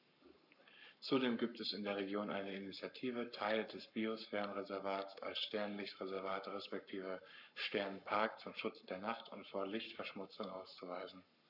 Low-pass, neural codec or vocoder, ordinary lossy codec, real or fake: 5.4 kHz; codec, 44.1 kHz, 7.8 kbps, Pupu-Codec; none; fake